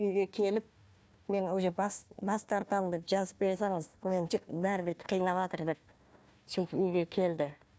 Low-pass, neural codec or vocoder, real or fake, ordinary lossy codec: none; codec, 16 kHz, 1 kbps, FunCodec, trained on Chinese and English, 50 frames a second; fake; none